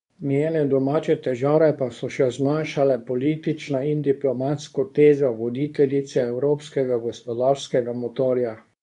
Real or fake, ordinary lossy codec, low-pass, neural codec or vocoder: fake; none; 10.8 kHz; codec, 24 kHz, 0.9 kbps, WavTokenizer, medium speech release version 2